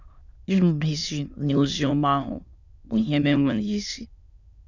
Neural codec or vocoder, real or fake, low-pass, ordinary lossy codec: autoencoder, 22.05 kHz, a latent of 192 numbers a frame, VITS, trained on many speakers; fake; 7.2 kHz; none